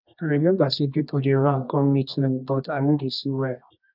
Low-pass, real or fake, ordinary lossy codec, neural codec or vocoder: 5.4 kHz; fake; none; codec, 24 kHz, 0.9 kbps, WavTokenizer, medium music audio release